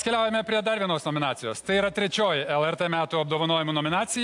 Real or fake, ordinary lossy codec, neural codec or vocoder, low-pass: real; AAC, 64 kbps; none; 10.8 kHz